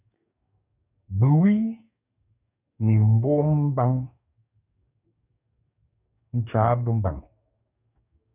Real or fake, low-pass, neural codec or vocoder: fake; 3.6 kHz; codec, 44.1 kHz, 2.6 kbps, DAC